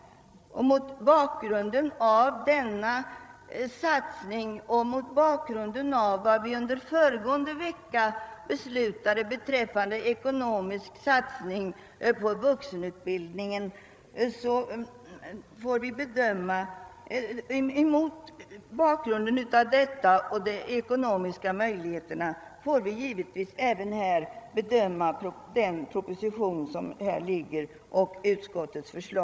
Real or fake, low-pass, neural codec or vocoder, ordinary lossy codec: fake; none; codec, 16 kHz, 16 kbps, FreqCodec, larger model; none